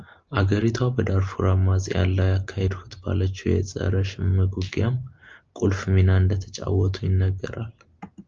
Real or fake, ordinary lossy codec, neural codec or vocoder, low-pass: real; Opus, 24 kbps; none; 7.2 kHz